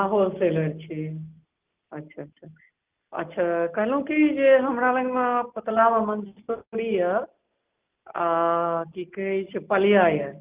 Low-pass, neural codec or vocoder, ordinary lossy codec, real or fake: 3.6 kHz; none; Opus, 24 kbps; real